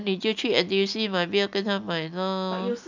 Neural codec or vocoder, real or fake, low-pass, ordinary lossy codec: none; real; 7.2 kHz; none